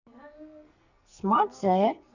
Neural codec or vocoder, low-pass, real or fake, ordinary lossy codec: codec, 44.1 kHz, 2.6 kbps, SNAC; 7.2 kHz; fake; none